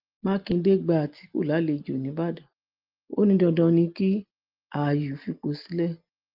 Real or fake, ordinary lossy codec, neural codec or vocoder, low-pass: real; Opus, 24 kbps; none; 5.4 kHz